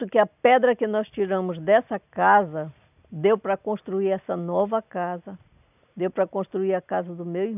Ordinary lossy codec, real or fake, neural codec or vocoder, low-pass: none; real; none; 3.6 kHz